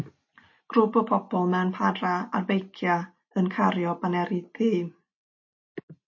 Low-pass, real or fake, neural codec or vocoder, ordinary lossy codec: 7.2 kHz; real; none; MP3, 32 kbps